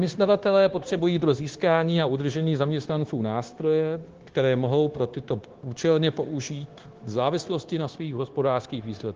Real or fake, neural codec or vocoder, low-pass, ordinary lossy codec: fake; codec, 16 kHz, 0.9 kbps, LongCat-Audio-Codec; 7.2 kHz; Opus, 16 kbps